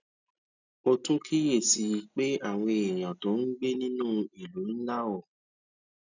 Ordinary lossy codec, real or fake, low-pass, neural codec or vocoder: none; real; 7.2 kHz; none